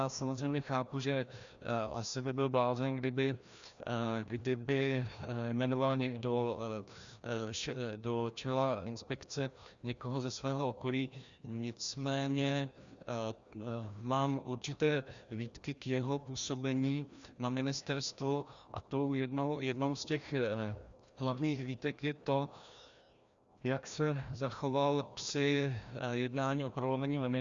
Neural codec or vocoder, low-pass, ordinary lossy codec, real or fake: codec, 16 kHz, 1 kbps, FreqCodec, larger model; 7.2 kHz; Opus, 64 kbps; fake